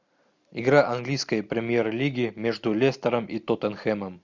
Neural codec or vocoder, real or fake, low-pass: none; real; 7.2 kHz